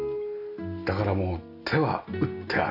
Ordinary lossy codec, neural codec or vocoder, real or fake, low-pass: AAC, 48 kbps; none; real; 5.4 kHz